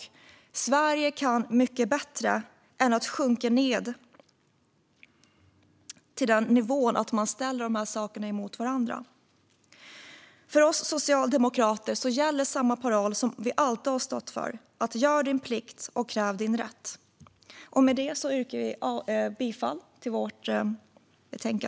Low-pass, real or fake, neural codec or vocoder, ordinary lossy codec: none; real; none; none